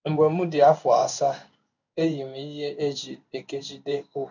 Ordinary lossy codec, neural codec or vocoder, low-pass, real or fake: none; codec, 16 kHz in and 24 kHz out, 1 kbps, XY-Tokenizer; 7.2 kHz; fake